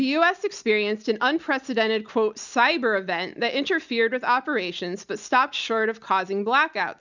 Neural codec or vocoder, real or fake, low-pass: none; real; 7.2 kHz